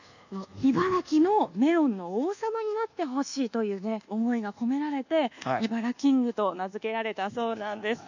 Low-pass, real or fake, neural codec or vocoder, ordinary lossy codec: 7.2 kHz; fake; codec, 24 kHz, 1.2 kbps, DualCodec; none